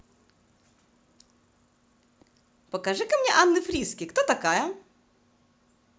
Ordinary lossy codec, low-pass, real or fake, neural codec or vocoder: none; none; real; none